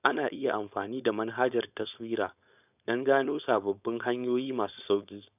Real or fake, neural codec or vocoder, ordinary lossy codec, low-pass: fake; codec, 16 kHz, 4.8 kbps, FACodec; none; 3.6 kHz